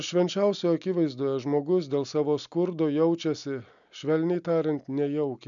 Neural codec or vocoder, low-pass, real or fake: none; 7.2 kHz; real